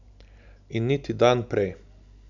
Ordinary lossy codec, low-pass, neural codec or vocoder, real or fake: none; 7.2 kHz; none; real